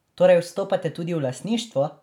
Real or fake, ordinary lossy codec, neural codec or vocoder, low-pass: real; none; none; 19.8 kHz